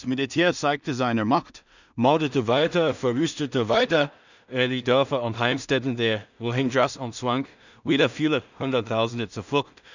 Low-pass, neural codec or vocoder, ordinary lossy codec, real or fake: 7.2 kHz; codec, 16 kHz in and 24 kHz out, 0.4 kbps, LongCat-Audio-Codec, two codebook decoder; none; fake